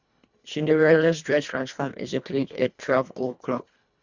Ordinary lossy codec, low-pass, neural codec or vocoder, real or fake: Opus, 64 kbps; 7.2 kHz; codec, 24 kHz, 1.5 kbps, HILCodec; fake